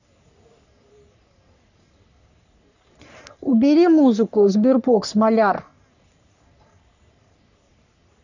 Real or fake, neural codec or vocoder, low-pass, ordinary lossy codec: fake; codec, 44.1 kHz, 3.4 kbps, Pupu-Codec; 7.2 kHz; none